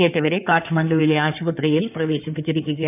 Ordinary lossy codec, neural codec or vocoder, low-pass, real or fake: none; codec, 16 kHz in and 24 kHz out, 2.2 kbps, FireRedTTS-2 codec; 3.6 kHz; fake